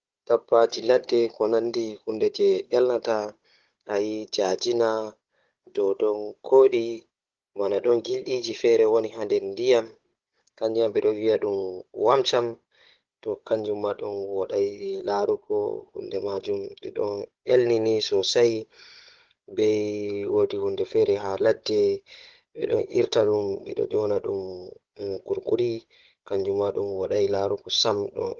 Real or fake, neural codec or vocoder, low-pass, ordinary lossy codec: fake; codec, 16 kHz, 16 kbps, FunCodec, trained on Chinese and English, 50 frames a second; 7.2 kHz; Opus, 16 kbps